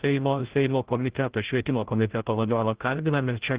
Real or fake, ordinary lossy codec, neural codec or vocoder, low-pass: fake; Opus, 16 kbps; codec, 16 kHz, 0.5 kbps, FreqCodec, larger model; 3.6 kHz